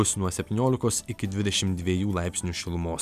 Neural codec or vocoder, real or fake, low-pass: none; real; 14.4 kHz